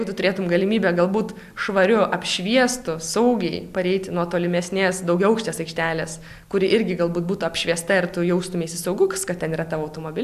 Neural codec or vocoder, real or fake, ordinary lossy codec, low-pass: none; real; AAC, 96 kbps; 14.4 kHz